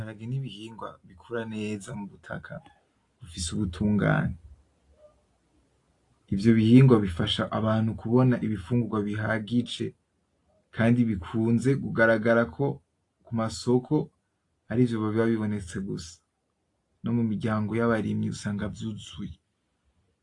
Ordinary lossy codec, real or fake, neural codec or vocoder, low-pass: AAC, 48 kbps; real; none; 10.8 kHz